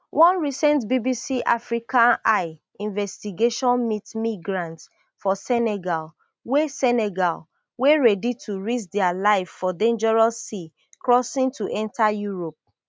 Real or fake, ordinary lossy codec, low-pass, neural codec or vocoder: real; none; none; none